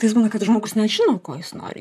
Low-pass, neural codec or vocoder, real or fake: 14.4 kHz; vocoder, 44.1 kHz, 128 mel bands, Pupu-Vocoder; fake